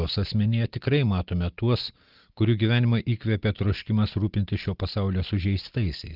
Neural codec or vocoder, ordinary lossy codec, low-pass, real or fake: none; Opus, 32 kbps; 5.4 kHz; real